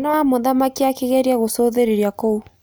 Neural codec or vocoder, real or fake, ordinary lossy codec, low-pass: none; real; none; none